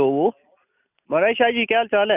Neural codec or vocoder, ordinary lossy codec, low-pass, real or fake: none; none; 3.6 kHz; real